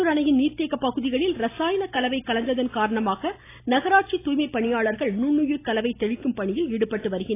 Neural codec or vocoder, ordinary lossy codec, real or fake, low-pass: none; AAC, 24 kbps; real; 3.6 kHz